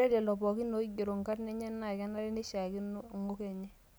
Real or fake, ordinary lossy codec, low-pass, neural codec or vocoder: real; none; none; none